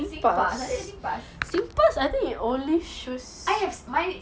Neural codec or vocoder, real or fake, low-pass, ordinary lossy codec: none; real; none; none